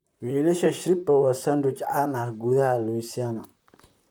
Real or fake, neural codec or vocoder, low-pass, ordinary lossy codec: fake; vocoder, 44.1 kHz, 128 mel bands, Pupu-Vocoder; 19.8 kHz; none